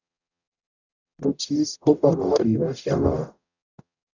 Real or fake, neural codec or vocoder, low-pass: fake; codec, 44.1 kHz, 0.9 kbps, DAC; 7.2 kHz